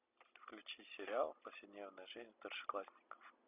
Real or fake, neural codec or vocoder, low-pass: real; none; 3.6 kHz